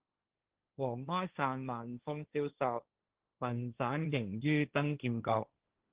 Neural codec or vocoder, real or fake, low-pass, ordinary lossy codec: codec, 16 kHz, 1.1 kbps, Voila-Tokenizer; fake; 3.6 kHz; Opus, 16 kbps